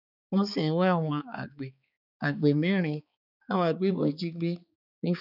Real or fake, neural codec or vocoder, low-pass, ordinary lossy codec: fake; codec, 16 kHz, 4 kbps, X-Codec, HuBERT features, trained on balanced general audio; 5.4 kHz; none